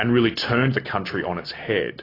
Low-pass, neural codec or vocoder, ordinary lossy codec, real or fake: 5.4 kHz; none; AAC, 24 kbps; real